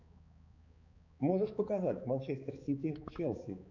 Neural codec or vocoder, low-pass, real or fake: codec, 16 kHz, 4 kbps, X-Codec, HuBERT features, trained on balanced general audio; 7.2 kHz; fake